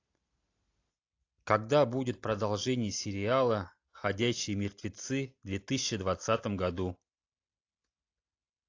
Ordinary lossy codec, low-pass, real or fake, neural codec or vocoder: AAC, 48 kbps; 7.2 kHz; real; none